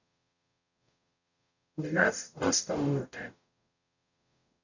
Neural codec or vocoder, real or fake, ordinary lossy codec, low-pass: codec, 44.1 kHz, 0.9 kbps, DAC; fake; none; 7.2 kHz